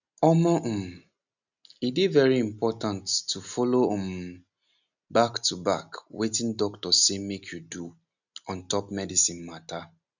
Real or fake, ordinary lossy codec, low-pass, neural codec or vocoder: real; none; 7.2 kHz; none